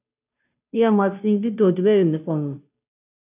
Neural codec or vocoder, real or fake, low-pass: codec, 16 kHz, 0.5 kbps, FunCodec, trained on Chinese and English, 25 frames a second; fake; 3.6 kHz